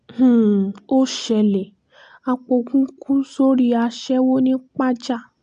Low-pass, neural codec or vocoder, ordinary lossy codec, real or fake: 9.9 kHz; none; AAC, 64 kbps; real